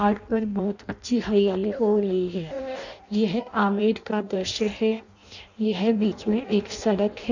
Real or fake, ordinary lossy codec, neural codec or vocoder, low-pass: fake; none; codec, 16 kHz in and 24 kHz out, 0.6 kbps, FireRedTTS-2 codec; 7.2 kHz